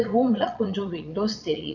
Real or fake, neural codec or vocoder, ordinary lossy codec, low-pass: fake; vocoder, 22.05 kHz, 80 mel bands, Vocos; none; 7.2 kHz